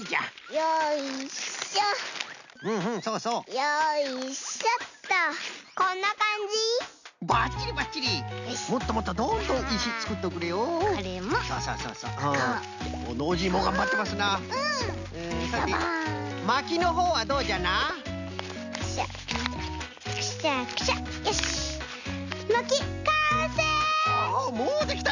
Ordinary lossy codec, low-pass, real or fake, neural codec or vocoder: none; 7.2 kHz; real; none